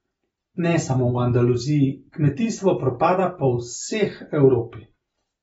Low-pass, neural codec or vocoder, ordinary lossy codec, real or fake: 19.8 kHz; none; AAC, 24 kbps; real